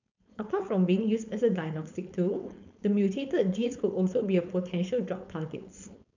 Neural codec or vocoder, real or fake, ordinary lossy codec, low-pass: codec, 16 kHz, 4.8 kbps, FACodec; fake; none; 7.2 kHz